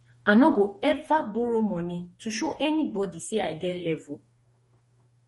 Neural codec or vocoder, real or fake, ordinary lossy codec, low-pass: codec, 44.1 kHz, 2.6 kbps, DAC; fake; MP3, 48 kbps; 19.8 kHz